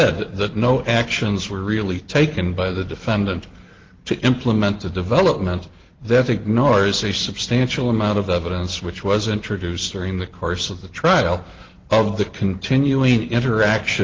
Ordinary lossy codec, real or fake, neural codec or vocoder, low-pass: Opus, 16 kbps; real; none; 7.2 kHz